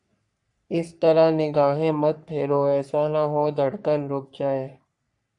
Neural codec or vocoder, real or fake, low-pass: codec, 44.1 kHz, 3.4 kbps, Pupu-Codec; fake; 10.8 kHz